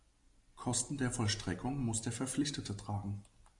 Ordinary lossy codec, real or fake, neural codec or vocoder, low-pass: AAC, 64 kbps; real; none; 10.8 kHz